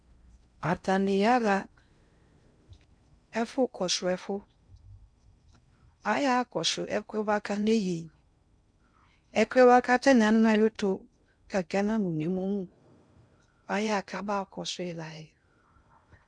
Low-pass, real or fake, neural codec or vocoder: 9.9 kHz; fake; codec, 16 kHz in and 24 kHz out, 0.6 kbps, FocalCodec, streaming, 4096 codes